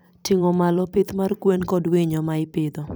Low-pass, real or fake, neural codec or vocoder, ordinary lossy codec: none; fake; vocoder, 44.1 kHz, 128 mel bands every 256 samples, BigVGAN v2; none